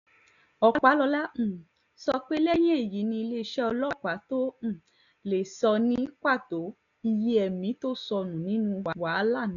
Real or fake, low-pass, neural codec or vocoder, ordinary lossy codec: real; 7.2 kHz; none; none